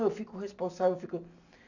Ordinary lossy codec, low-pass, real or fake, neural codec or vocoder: none; 7.2 kHz; real; none